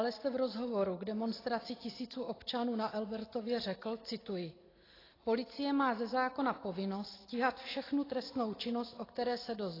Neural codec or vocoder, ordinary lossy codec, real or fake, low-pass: none; AAC, 24 kbps; real; 5.4 kHz